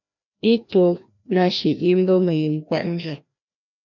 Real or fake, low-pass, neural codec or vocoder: fake; 7.2 kHz; codec, 16 kHz, 1 kbps, FreqCodec, larger model